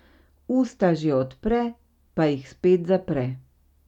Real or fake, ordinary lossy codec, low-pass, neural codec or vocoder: real; none; 19.8 kHz; none